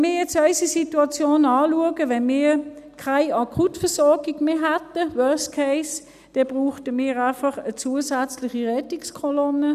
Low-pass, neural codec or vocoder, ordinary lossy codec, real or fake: 14.4 kHz; none; none; real